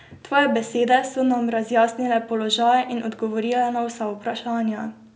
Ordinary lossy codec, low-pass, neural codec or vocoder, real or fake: none; none; none; real